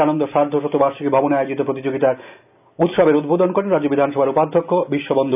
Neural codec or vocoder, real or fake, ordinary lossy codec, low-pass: none; real; none; 3.6 kHz